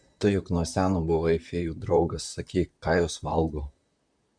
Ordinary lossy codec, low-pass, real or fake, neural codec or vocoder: MP3, 64 kbps; 9.9 kHz; fake; vocoder, 44.1 kHz, 128 mel bands, Pupu-Vocoder